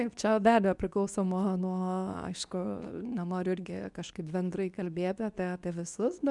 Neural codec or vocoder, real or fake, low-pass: codec, 24 kHz, 0.9 kbps, WavTokenizer, medium speech release version 2; fake; 10.8 kHz